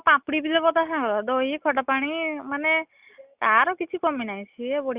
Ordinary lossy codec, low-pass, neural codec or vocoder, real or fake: Opus, 64 kbps; 3.6 kHz; none; real